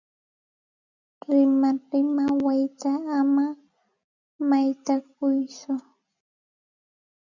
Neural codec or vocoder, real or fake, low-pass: none; real; 7.2 kHz